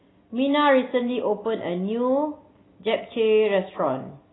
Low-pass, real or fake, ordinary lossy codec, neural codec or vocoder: 7.2 kHz; real; AAC, 16 kbps; none